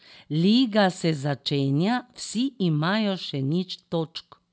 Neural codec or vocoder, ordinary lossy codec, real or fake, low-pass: none; none; real; none